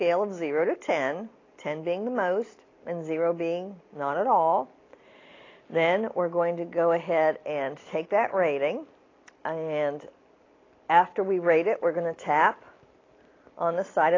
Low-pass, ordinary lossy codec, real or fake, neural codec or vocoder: 7.2 kHz; AAC, 32 kbps; real; none